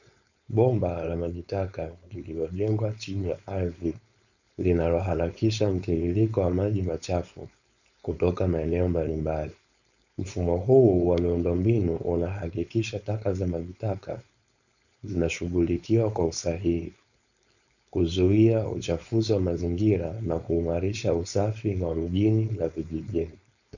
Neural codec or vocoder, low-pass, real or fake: codec, 16 kHz, 4.8 kbps, FACodec; 7.2 kHz; fake